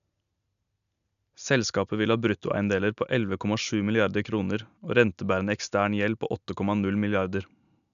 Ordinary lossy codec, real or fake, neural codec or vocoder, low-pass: none; real; none; 7.2 kHz